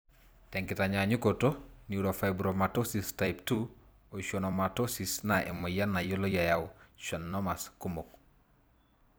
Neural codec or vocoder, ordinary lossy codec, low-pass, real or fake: vocoder, 44.1 kHz, 128 mel bands every 256 samples, BigVGAN v2; none; none; fake